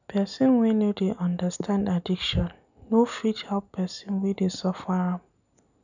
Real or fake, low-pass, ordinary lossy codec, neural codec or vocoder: real; 7.2 kHz; none; none